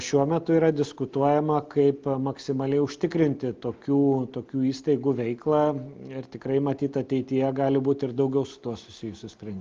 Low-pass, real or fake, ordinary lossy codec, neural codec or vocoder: 7.2 kHz; real; Opus, 32 kbps; none